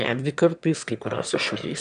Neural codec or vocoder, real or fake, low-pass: autoencoder, 22.05 kHz, a latent of 192 numbers a frame, VITS, trained on one speaker; fake; 9.9 kHz